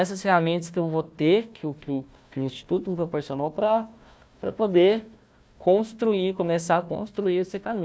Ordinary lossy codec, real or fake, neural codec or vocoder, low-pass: none; fake; codec, 16 kHz, 1 kbps, FunCodec, trained on Chinese and English, 50 frames a second; none